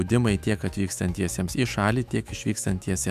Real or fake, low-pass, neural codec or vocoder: fake; 14.4 kHz; autoencoder, 48 kHz, 128 numbers a frame, DAC-VAE, trained on Japanese speech